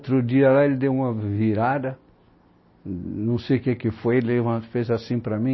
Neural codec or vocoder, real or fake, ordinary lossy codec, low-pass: none; real; MP3, 24 kbps; 7.2 kHz